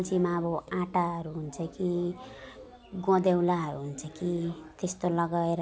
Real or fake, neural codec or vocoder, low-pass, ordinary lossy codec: real; none; none; none